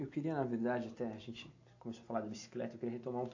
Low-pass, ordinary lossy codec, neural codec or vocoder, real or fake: 7.2 kHz; AAC, 48 kbps; none; real